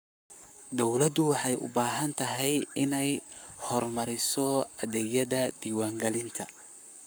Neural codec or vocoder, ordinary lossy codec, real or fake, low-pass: codec, 44.1 kHz, 7.8 kbps, Pupu-Codec; none; fake; none